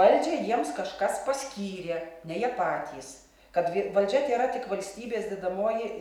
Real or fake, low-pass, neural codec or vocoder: real; 19.8 kHz; none